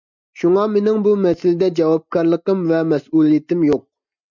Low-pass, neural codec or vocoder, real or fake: 7.2 kHz; none; real